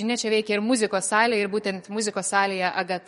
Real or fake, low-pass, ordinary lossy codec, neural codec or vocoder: fake; 19.8 kHz; MP3, 48 kbps; codec, 44.1 kHz, 7.8 kbps, DAC